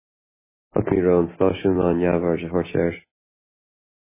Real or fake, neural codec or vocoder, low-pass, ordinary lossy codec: real; none; 3.6 kHz; MP3, 16 kbps